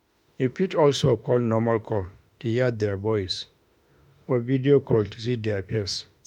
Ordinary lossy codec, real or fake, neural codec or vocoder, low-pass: MP3, 96 kbps; fake; autoencoder, 48 kHz, 32 numbers a frame, DAC-VAE, trained on Japanese speech; 19.8 kHz